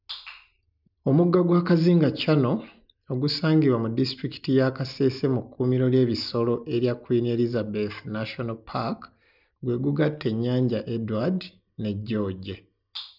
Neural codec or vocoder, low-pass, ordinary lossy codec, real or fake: none; 5.4 kHz; none; real